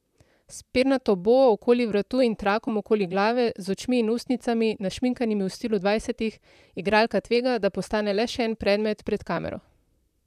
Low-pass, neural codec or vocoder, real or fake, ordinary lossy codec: 14.4 kHz; vocoder, 44.1 kHz, 128 mel bands, Pupu-Vocoder; fake; none